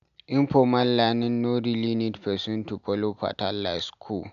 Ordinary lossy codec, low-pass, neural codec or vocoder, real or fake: none; 7.2 kHz; none; real